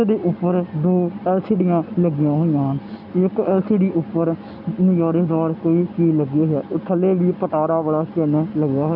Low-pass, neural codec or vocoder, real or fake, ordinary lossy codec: 5.4 kHz; none; real; none